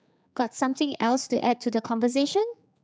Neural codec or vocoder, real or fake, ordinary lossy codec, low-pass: codec, 16 kHz, 4 kbps, X-Codec, HuBERT features, trained on general audio; fake; none; none